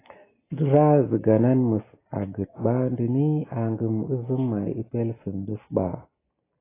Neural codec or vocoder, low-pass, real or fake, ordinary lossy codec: none; 3.6 kHz; real; AAC, 16 kbps